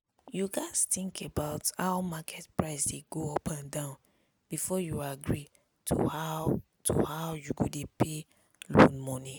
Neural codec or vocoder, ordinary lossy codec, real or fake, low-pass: none; none; real; none